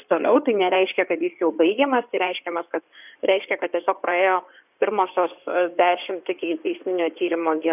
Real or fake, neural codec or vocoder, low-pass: fake; codec, 16 kHz in and 24 kHz out, 2.2 kbps, FireRedTTS-2 codec; 3.6 kHz